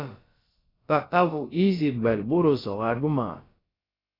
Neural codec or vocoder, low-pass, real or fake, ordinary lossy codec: codec, 16 kHz, about 1 kbps, DyCAST, with the encoder's durations; 5.4 kHz; fake; AAC, 24 kbps